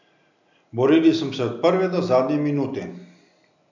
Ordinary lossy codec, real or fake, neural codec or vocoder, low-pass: none; real; none; 7.2 kHz